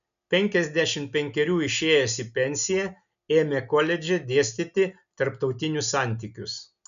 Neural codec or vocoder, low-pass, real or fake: none; 7.2 kHz; real